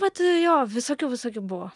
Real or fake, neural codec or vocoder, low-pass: real; none; 10.8 kHz